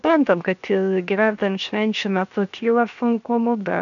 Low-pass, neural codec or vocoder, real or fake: 7.2 kHz; codec, 16 kHz, 0.7 kbps, FocalCodec; fake